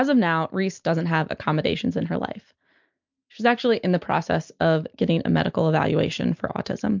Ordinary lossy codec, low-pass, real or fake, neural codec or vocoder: MP3, 64 kbps; 7.2 kHz; real; none